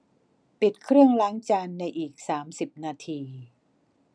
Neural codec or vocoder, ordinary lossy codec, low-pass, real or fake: none; none; 9.9 kHz; real